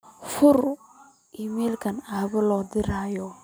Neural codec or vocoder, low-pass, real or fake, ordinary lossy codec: none; none; real; none